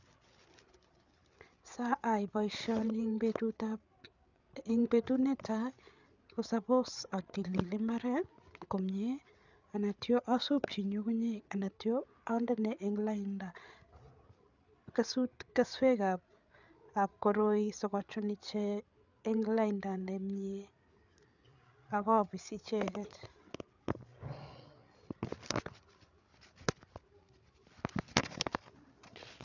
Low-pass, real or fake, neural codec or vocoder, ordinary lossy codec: 7.2 kHz; fake; codec, 16 kHz, 8 kbps, FreqCodec, larger model; none